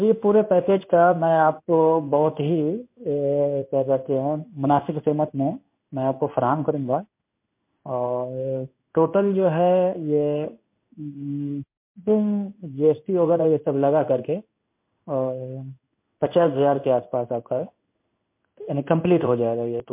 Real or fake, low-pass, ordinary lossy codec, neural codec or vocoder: fake; 3.6 kHz; MP3, 32 kbps; codec, 16 kHz in and 24 kHz out, 1 kbps, XY-Tokenizer